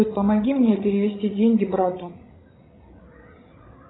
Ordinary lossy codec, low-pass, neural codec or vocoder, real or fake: AAC, 16 kbps; 7.2 kHz; codec, 16 kHz, 16 kbps, FreqCodec, larger model; fake